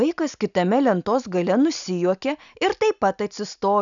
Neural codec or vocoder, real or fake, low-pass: none; real; 7.2 kHz